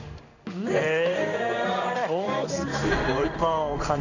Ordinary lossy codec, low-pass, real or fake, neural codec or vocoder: none; 7.2 kHz; fake; codec, 16 kHz in and 24 kHz out, 1 kbps, XY-Tokenizer